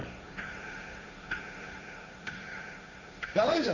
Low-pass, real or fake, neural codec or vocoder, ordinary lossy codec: 7.2 kHz; fake; codec, 16 kHz, 1.1 kbps, Voila-Tokenizer; Opus, 64 kbps